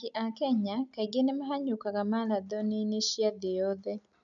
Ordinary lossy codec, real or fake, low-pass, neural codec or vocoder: none; real; 7.2 kHz; none